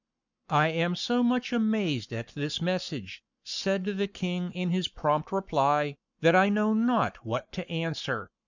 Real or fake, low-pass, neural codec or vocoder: fake; 7.2 kHz; codec, 44.1 kHz, 7.8 kbps, Pupu-Codec